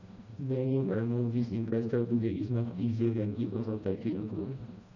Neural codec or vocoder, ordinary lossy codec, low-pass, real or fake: codec, 16 kHz, 1 kbps, FreqCodec, smaller model; none; 7.2 kHz; fake